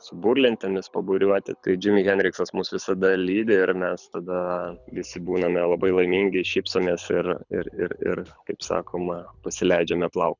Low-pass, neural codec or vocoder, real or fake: 7.2 kHz; codec, 24 kHz, 6 kbps, HILCodec; fake